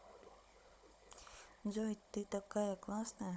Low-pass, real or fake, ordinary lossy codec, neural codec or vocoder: none; fake; none; codec, 16 kHz, 16 kbps, FunCodec, trained on Chinese and English, 50 frames a second